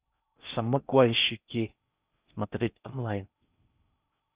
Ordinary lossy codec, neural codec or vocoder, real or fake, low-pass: Opus, 64 kbps; codec, 16 kHz in and 24 kHz out, 0.6 kbps, FocalCodec, streaming, 4096 codes; fake; 3.6 kHz